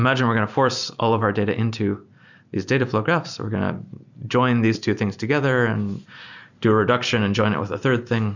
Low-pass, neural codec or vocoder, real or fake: 7.2 kHz; none; real